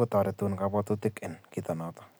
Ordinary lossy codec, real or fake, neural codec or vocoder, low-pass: none; real; none; none